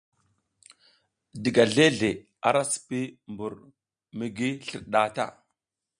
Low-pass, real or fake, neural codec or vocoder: 9.9 kHz; real; none